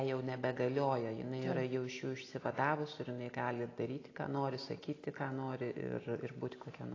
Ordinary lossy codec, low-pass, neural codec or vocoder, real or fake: AAC, 32 kbps; 7.2 kHz; none; real